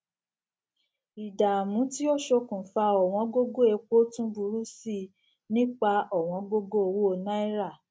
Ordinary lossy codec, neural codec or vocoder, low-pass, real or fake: none; none; none; real